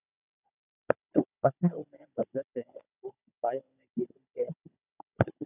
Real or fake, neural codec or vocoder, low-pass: fake; codec, 16 kHz, 16 kbps, FunCodec, trained on Chinese and English, 50 frames a second; 3.6 kHz